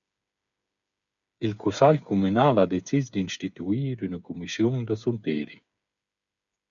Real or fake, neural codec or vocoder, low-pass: fake; codec, 16 kHz, 8 kbps, FreqCodec, smaller model; 7.2 kHz